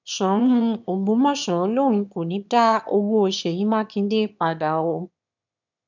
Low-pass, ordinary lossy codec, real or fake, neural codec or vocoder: 7.2 kHz; none; fake; autoencoder, 22.05 kHz, a latent of 192 numbers a frame, VITS, trained on one speaker